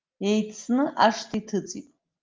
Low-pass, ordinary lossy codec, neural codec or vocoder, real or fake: 7.2 kHz; Opus, 24 kbps; none; real